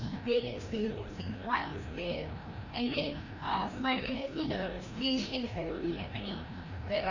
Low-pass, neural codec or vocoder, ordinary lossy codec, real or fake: 7.2 kHz; codec, 16 kHz, 1 kbps, FreqCodec, larger model; none; fake